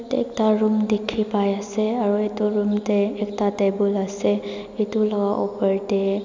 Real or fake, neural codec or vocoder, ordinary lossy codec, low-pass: real; none; MP3, 64 kbps; 7.2 kHz